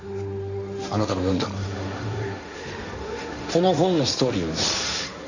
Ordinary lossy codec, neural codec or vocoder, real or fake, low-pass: none; codec, 16 kHz, 1.1 kbps, Voila-Tokenizer; fake; 7.2 kHz